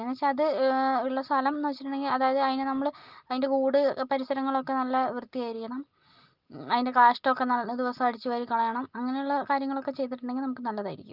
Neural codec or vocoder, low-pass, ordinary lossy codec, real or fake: none; 5.4 kHz; Opus, 32 kbps; real